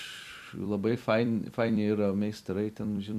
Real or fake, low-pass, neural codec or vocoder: fake; 14.4 kHz; vocoder, 44.1 kHz, 128 mel bands every 256 samples, BigVGAN v2